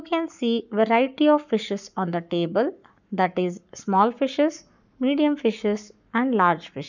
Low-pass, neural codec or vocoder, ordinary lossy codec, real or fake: 7.2 kHz; codec, 44.1 kHz, 7.8 kbps, Pupu-Codec; none; fake